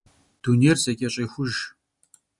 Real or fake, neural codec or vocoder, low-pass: real; none; 10.8 kHz